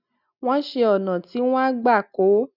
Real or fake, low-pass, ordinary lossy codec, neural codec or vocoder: real; 5.4 kHz; none; none